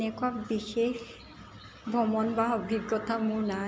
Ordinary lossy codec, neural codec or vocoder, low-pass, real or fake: none; none; none; real